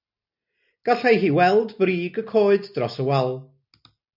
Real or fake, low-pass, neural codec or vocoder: real; 5.4 kHz; none